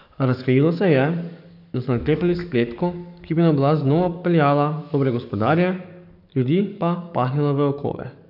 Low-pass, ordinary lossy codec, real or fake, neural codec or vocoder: 5.4 kHz; none; fake; codec, 44.1 kHz, 7.8 kbps, DAC